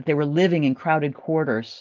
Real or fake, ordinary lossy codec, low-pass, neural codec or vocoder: real; Opus, 32 kbps; 7.2 kHz; none